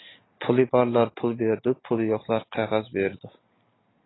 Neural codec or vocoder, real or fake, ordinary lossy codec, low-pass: none; real; AAC, 16 kbps; 7.2 kHz